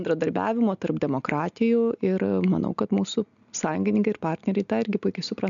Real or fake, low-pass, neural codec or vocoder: real; 7.2 kHz; none